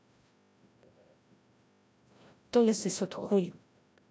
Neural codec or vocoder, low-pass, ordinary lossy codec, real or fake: codec, 16 kHz, 0.5 kbps, FreqCodec, larger model; none; none; fake